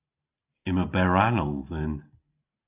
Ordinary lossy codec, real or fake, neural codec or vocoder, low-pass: AAC, 32 kbps; real; none; 3.6 kHz